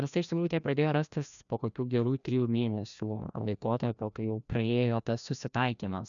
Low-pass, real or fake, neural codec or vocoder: 7.2 kHz; fake; codec, 16 kHz, 1 kbps, FreqCodec, larger model